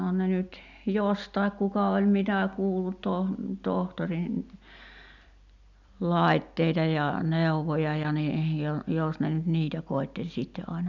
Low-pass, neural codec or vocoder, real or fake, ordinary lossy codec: 7.2 kHz; none; real; none